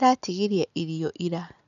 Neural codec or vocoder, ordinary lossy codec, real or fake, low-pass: none; none; real; 7.2 kHz